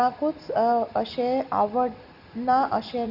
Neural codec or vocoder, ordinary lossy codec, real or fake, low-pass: none; none; real; 5.4 kHz